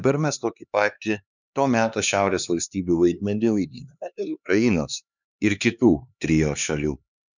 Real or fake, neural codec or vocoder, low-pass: fake; codec, 16 kHz, 2 kbps, X-Codec, HuBERT features, trained on LibriSpeech; 7.2 kHz